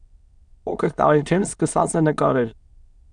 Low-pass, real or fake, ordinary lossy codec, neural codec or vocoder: 9.9 kHz; fake; Opus, 64 kbps; autoencoder, 22.05 kHz, a latent of 192 numbers a frame, VITS, trained on many speakers